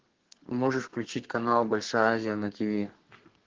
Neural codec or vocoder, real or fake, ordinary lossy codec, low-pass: codec, 32 kHz, 1.9 kbps, SNAC; fake; Opus, 16 kbps; 7.2 kHz